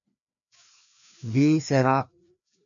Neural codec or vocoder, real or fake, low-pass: codec, 16 kHz, 2 kbps, FreqCodec, larger model; fake; 7.2 kHz